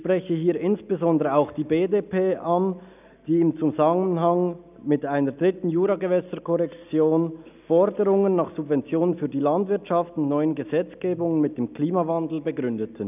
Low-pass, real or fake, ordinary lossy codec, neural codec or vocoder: 3.6 kHz; real; none; none